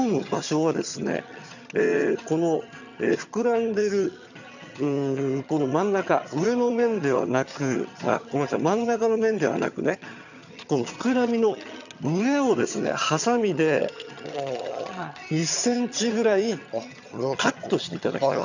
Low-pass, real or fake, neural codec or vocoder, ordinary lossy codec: 7.2 kHz; fake; vocoder, 22.05 kHz, 80 mel bands, HiFi-GAN; none